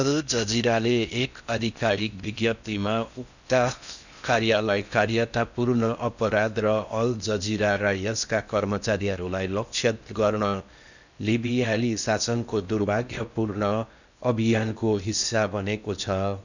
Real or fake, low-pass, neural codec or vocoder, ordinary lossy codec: fake; 7.2 kHz; codec, 16 kHz in and 24 kHz out, 0.6 kbps, FocalCodec, streaming, 4096 codes; none